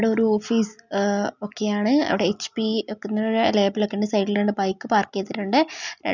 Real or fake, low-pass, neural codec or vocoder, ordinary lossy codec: real; 7.2 kHz; none; none